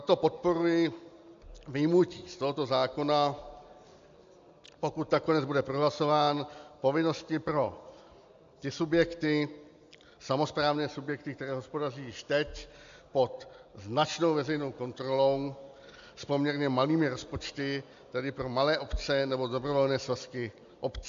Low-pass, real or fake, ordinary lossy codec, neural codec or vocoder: 7.2 kHz; real; AAC, 64 kbps; none